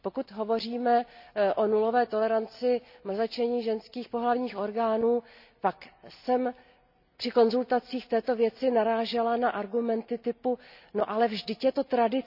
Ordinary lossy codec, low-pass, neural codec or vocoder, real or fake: none; 5.4 kHz; none; real